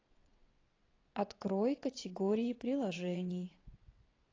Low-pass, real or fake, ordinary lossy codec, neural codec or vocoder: 7.2 kHz; fake; AAC, 32 kbps; vocoder, 22.05 kHz, 80 mel bands, WaveNeXt